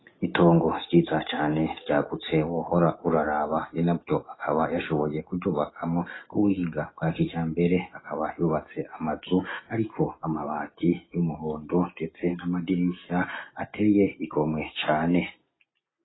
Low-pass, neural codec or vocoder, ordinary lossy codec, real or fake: 7.2 kHz; none; AAC, 16 kbps; real